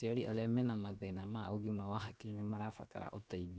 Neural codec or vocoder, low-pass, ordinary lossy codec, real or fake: codec, 16 kHz, 0.7 kbps, FocalCodec; none; none; fake